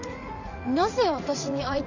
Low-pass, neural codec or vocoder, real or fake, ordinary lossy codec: 7.2 kHz; vocoder, 44.1 kHz, 80 mel bands, Vocos; fake; none